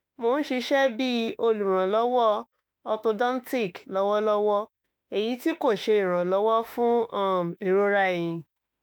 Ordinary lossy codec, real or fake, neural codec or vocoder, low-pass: none; fake; autoencoder, 48 kHz, 32 numbers a frame, DAC-VAE, trained on Japanese speech; none